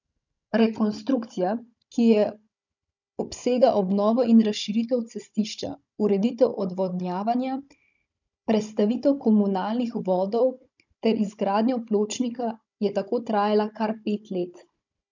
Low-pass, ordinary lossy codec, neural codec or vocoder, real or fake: 7.2 kHz; none; codec, 16 kHz, 16 kbps, FunCodec, trained on Chinese and English, 50 frames a second; fake